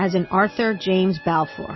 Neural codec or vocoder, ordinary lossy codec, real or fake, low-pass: none; MP3, 24 kbps; real; 7.2 kHz